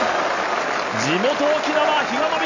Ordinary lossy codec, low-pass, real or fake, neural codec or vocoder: AAC, 48 kbps; 7.2 kHz; real; none